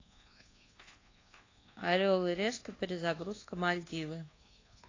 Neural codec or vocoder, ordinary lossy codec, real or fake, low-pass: codec, 24 kHz, 1.2 kbps, DualCodec; AAC, 32 kbps; fake; 7.2 kHz